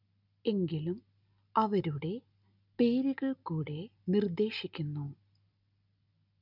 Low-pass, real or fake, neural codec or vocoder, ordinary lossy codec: 5.4 kHz; real; none; none